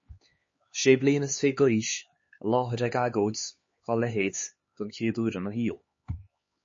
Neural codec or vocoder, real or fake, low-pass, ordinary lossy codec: codec, 16 kHz, 4 kbps, X-Codec, HuBERT features, trained on LibriSpeech; fake; 7.2 kHz; MP3, 32 kbps